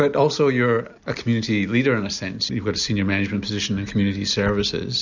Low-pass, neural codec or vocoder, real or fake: 7.2 kHz; none; real